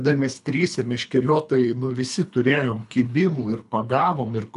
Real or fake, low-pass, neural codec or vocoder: fake; 10.8 kHz; codec, 24 kHz, 3 kbps, HILCodec